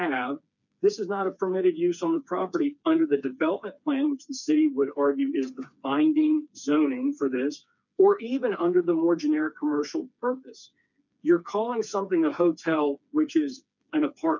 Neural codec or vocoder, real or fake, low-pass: codec, 16 kHz, 4 kbps, FreqCodec, smaller model; fake; 7.2 kHz